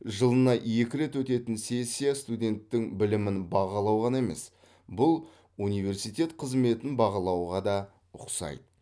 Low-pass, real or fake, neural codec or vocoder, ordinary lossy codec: none; real; none; none